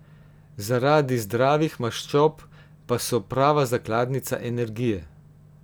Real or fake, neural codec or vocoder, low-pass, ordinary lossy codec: real; none; none; none